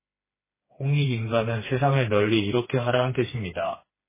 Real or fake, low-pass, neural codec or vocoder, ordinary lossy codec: fake; 3.6 kHz; codec, 16 kHz, 2 kbps, FreqCodec, smaller model; MP3, 16 kbps